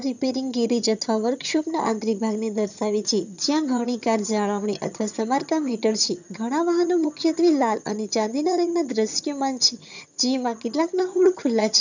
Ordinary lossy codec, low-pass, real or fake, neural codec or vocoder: none; 7.2 kHz; fake; vocoder, 22.05 kHz, 80 mel bands, HiFi-GAN